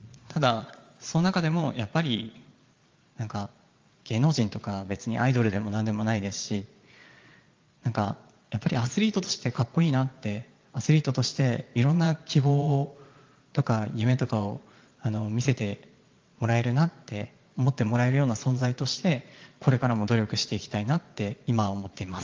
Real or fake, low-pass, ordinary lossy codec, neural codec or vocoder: fake; 7.2 kHz; Opus, 32 kbps; vocoder, 22.05 kHz, 80 mel bands, Vocos